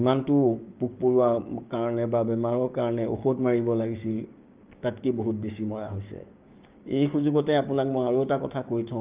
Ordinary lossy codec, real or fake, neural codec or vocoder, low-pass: Opus, 24 kbps; fake; codec, 16 kHz, 6 kbps, DAC; 3.6 kHz